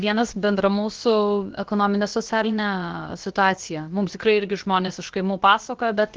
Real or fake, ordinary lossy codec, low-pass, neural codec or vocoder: fake; Opus, 16 kbps; 7.2 kHz; codec, 16 kHz, about 1 kbps, DyCAST, with the encoder's durations